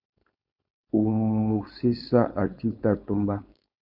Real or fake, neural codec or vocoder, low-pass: fake; codec, 16 kHz, 4.8 kbps, FACodec; 5.4 kHz